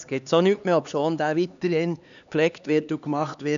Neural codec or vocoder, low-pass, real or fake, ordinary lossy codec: codec, 16 kHz, 2 kbps, X-Codec, HuBERT features, trained on LibriSpeech; 7.2 kHz; fake; none